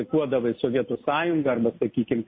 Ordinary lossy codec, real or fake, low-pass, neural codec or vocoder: MP3, 24 kbps; real; 7.2 kHz; none